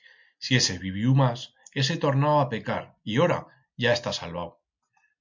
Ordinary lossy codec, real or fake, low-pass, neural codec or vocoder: MP3, 64 kbps; real; 7.2 kHz; none